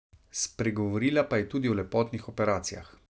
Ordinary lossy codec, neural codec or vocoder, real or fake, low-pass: none; none; real; none